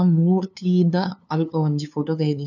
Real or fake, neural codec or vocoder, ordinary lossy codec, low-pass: fake; codec, 16 kHz, 2 kbps, FunCodec, trained on LibriTTS, 25 frames a second; none; 7.2 kHz